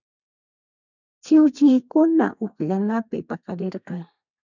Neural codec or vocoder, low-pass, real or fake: codec, 32 kHz, 1.9 kbps, SNAC; 7.2 kHz; fake